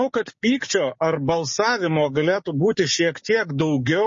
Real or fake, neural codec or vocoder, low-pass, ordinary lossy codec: fake; codec, 16 kHz, 6 kbps, DAC; 7.2 kHz; MP3, 32 kbps